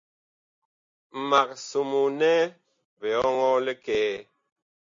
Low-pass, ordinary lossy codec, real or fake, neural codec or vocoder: 7.2 kHz; MP3, 48 kbps; real; none